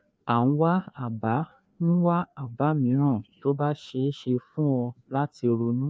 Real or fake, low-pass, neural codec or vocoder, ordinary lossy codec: fake; none; codec, 16 kHz, 2 kbps, FreqCodec, larger model; none